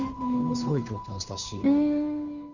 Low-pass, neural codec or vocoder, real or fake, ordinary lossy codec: 7.2 kHz; codec, 16 kHz, 2 kbps, FunCodec, trained on Chinese and English, 25 frames a second; fake; none